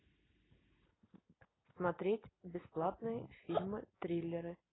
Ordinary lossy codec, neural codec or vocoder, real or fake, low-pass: AAC, 16 kbps; none; real; 7.2 kHz